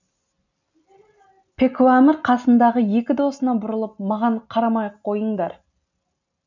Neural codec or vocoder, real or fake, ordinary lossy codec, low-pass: none; real; none; 7.2 kHz